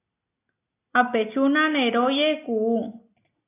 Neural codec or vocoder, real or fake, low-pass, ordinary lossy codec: none; real; 3.6 kHz; AAC, 24 kbps